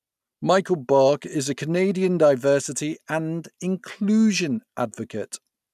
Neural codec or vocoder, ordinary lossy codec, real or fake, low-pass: none; none; real; 14.4 kHz